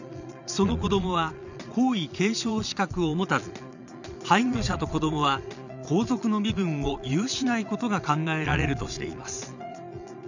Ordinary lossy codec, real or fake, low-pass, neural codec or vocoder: none; fake; 7.2 kHz; vocoder, 22.05 kHz, 80 mel bands, Vocos